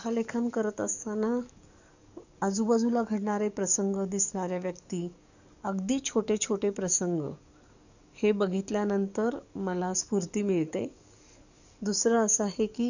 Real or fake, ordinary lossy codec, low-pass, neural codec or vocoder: fake; none; 7.2 kHz; codec, 44.1 kHz, 7.8 kbps, DAC